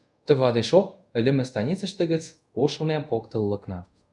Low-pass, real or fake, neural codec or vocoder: 10.8 kHz; fake; codec, 24 kHz, 0.5 kbps, DualCodec